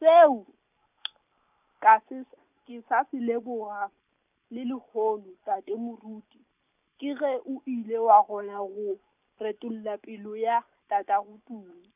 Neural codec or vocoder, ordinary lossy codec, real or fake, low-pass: none; none; real; 3.6 kHz